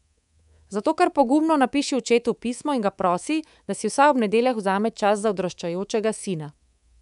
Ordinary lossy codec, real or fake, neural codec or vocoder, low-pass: none; fake; codec, 24 kHz, 3.1 kbps, DualCodec; 10.8 kHz